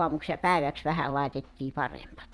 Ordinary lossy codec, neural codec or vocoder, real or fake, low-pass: none; vocoder, 22.05 kHz, 80 mel bands, Vocos; fake; none